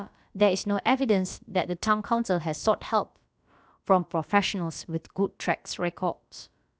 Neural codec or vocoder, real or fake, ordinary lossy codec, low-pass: codec, 16 kHz, about 1 kbps, DyCAST, with the encoder's durations; fake; none; none